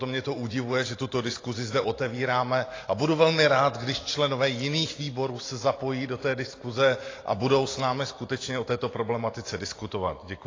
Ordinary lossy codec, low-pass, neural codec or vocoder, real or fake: AAC, 32 kbps; 7.2 kHz; none; real